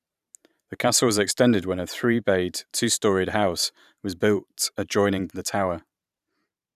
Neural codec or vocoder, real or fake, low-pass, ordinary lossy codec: vocoder, 44.1 kHz, 128 mel bands every 512 samples, BigVGAN v2; fake; 14.4 kHz; none